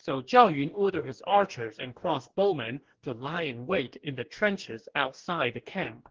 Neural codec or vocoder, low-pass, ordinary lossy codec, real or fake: codec, 44.1 kHz, 2.6 kbps, DAC; 7.2 kHz; Opus, 16 kbps; fake